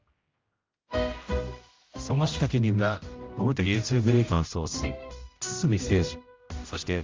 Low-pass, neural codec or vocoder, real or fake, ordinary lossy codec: 7.2 kHz; codec, 16 kHz, 0.5 kbps, X-Codec, HuBERT features, trained on general audio; fake; Opus, 32 kbps